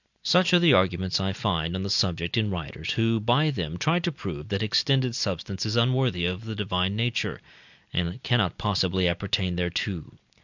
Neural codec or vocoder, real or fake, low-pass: none; real; 7.2 kHz